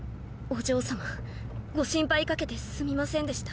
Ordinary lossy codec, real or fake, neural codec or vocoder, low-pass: none; real; none; none